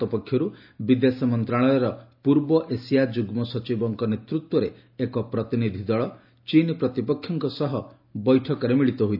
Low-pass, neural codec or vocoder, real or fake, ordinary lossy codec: 5.4 kHz; none; real; none